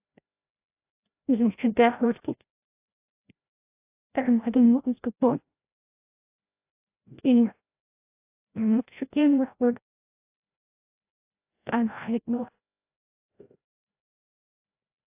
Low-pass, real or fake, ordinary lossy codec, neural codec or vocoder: 3.6 kHz; fake; AAC, 24 kbps; codec, 16 kHz, 0.5 kbps, FreqCodec, larger model